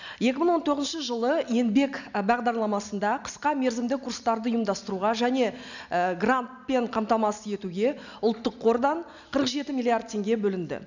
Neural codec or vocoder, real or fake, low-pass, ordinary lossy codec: none; real; 7.2 kHz; none